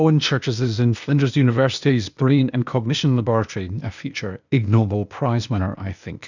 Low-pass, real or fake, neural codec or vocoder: 7.2 kHz; fake; codec, 16 kHz, 0.8 kbps, ZipCodec